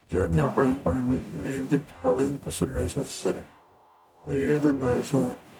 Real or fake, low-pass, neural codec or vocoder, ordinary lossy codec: fake; 19.8 kHz; codec, 44.1 kHz, 0.9 kbps, DAC; none